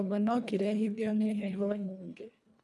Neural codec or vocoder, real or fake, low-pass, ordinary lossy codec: codec, 24 kHz, 1.5 kbps, HILCodec; fake; none; none